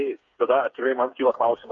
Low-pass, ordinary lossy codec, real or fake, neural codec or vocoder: 7.2 kHz; Opus, 64 kbps; fake; codec, 16 kHz, 4 kbps, FreqCodec, smaller model